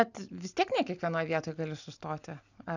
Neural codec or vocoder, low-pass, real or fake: none; 7.2 kHz; real